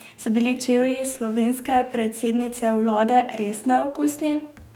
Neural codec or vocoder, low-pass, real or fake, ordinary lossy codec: codec, 44.1 kHz, 2.6 kbps, DAC; 19.8 kHz; fake; none